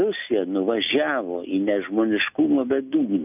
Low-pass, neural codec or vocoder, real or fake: 3.6 kHz; none; real